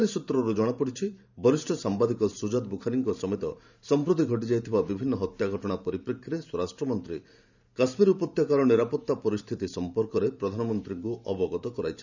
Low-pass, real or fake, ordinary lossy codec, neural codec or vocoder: 7.2 kHz; fake; none; vocoder, 44.1 kHz, 128 mel bands every 512 samples, BigVGAN v2